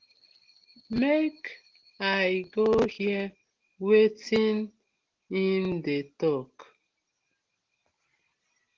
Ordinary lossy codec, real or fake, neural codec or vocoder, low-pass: Opus, 16 kbps; real; none; 7.2 kHz